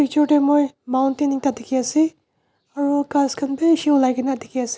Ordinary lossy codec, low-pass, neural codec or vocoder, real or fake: none; none; none; real